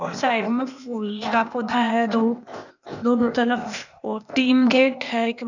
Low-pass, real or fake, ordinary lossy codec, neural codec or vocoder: 7.2 kHz; fake; none; codec, 16 kHz, 0.8 kbps, ZipCodec